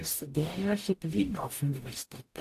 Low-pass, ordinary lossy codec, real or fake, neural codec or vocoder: 14.4 kHz; AAC, 64 kbps; fake; codec, 44.1 kHz, 0.9 kbps, DAC